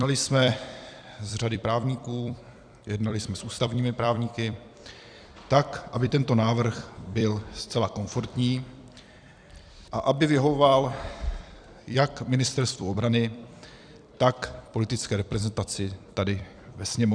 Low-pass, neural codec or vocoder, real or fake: 9.9 kHz; vocoder, 44.1 kHz, 128 mel bands every 256 samples, BigVGAN v2; fake